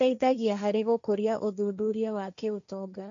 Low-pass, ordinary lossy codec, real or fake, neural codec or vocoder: 7.2 kHz; MP3, 64 kbps; fake; codec, 16 kHz, 1.1 kbps, Voila-Tokenizer